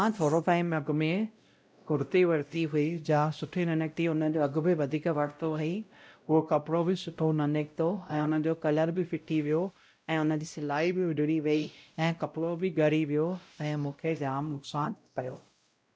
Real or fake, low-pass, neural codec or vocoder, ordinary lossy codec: fake; none; codec, 16 kHz, 0.5 kbps, X-Codec, WavLM features, trained on Multilingual LibriSpeech; none